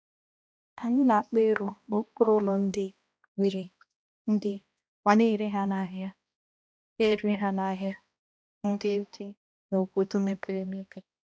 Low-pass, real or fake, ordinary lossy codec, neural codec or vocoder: none; fake; none; codec, 16 kHz, 1 kbps, X-Codec, HuBERT features, trained on balanced general audio